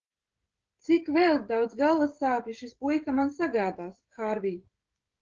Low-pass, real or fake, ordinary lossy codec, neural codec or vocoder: 7.2 kHz; fake; Opus, 16 kbps; codec, 16 kHz, 16 kbps, FreqCodec, smaller model